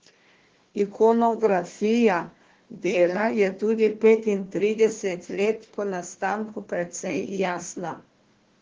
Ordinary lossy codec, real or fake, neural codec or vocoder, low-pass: Opus, 16 kbps; fake; codec, 16 kHz, 1 kbps, FunCodec, trained on Chinese and English, 50 frames a second; 7.2 kHz